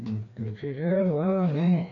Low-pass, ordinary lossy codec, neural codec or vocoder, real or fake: 7.2 kHz; none; codec, 16 kHz, 2 kbps, FreqCodec, larger model; fake